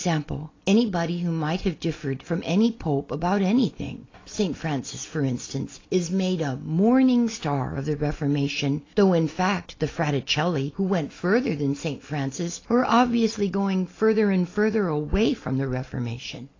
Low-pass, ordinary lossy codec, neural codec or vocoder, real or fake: 7.2 kHz; AAC, 32 kbps; none; real